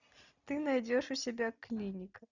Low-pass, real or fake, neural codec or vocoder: 7.2 kHz; real; none